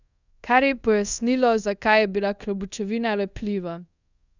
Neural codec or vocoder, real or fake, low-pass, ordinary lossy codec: codec, 24 kHz, 0.5 kbps, DualCodec; fake; 7.2 kHz; none